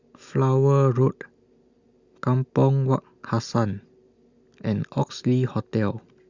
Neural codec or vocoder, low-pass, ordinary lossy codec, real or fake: none; 7.2 kHz; Opus, 64 kbps; real